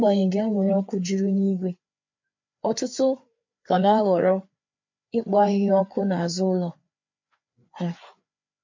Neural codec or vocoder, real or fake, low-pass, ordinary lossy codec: codec, 16 kHz, 4 kbps, FreqCodec, larger model; fake; 7.2 kHz; MP3, 48 kbps